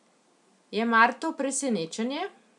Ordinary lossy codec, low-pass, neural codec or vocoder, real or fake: none; 10.8 kHz; none; real